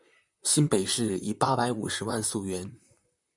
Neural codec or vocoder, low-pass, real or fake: vocoder, 44.1 kHz, 128 mel bands, Pupu-Vocoder; 10.8 kHz; fake